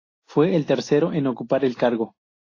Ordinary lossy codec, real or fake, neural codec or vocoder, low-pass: AAC, 32 kbps; real; none; 7.2 kHz